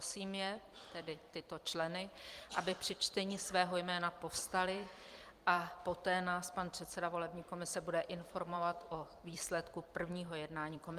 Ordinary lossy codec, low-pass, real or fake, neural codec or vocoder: Opus, 32 kbps; 14.4 kHz; fake; vocoder, 44.1 kHz, 128 mel bands every 256 samples, BigVGAN v2